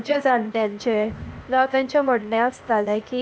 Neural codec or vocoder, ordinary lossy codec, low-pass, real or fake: codec, 16 kHz, 0.8 kbps, ZipCodec; none; none; fake